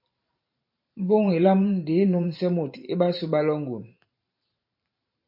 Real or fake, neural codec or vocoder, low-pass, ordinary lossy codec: fake; vocoder, 44.1 kHz, 128 mel bands every 512 samples, BigVGAN v2; 5.4 kHz; MP3, 32 kbps